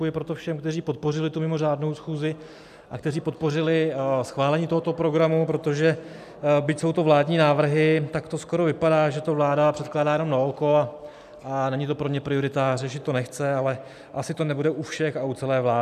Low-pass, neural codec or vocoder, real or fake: 14.4 kHz; none; real